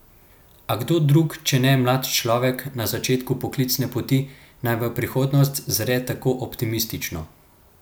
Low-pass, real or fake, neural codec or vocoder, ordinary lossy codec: none; real; none; none